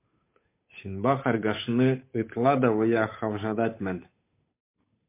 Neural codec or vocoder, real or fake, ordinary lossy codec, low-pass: codec, 16 kHz, 8 kbps, FunCodec, trained on Chinese and English, 25 frames a second; fake; MP3, 24 kbps; 3.6 kHz